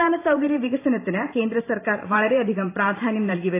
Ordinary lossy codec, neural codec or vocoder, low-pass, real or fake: AAC, 24 kbps; vocoder, 44.1 kHz, 128 mel bands every 512 samples, BigVGAN v2; 3.6 kHz; fake